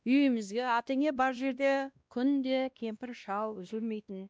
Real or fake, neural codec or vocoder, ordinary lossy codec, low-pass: fake; codec, 16 kHz, 1 kbps, X-Codec, WavLM features, trained on Multilingual LibriSpeech; none; none